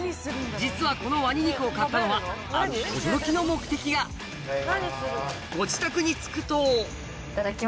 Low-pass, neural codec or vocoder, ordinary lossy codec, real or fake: none; none; none; real